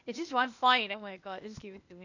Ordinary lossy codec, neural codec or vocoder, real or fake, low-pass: none; codec, 16 kHz, 0.8 kbps, ZipCodec; fake; 7.2 kHz